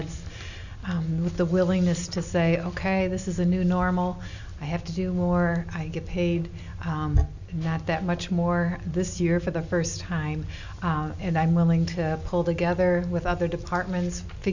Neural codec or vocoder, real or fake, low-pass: none; real; 7.2 kHz